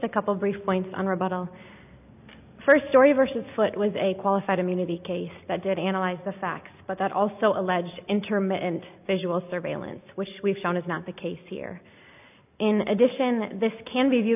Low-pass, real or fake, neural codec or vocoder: 3.6 kHz; real; none